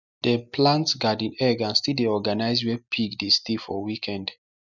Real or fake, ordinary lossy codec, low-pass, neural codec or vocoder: real; none; 7.2 kHz; none